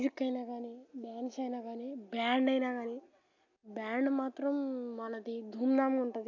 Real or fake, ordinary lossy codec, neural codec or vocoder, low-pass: real; AAC, 48 kbps; none; 7.2 kHz